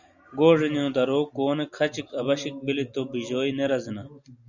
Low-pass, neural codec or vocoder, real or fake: 7.2 kHz; none; real